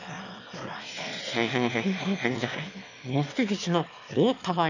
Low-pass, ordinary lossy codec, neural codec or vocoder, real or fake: 7.2 kHz; none; autoencoder, 22.05 kHz, a latent of 192 numbers a frame, VITS, trained on one speaker; fake